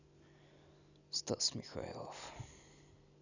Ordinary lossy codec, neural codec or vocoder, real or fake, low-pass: none; none; real; 7.2 kHz